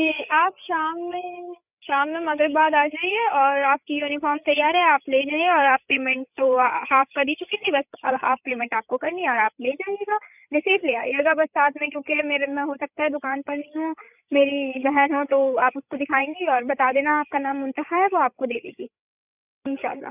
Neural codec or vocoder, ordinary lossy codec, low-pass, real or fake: codec, 16 kHz, 8 kbps, FreqCodec, larger model; none; 3.6 kHz; fake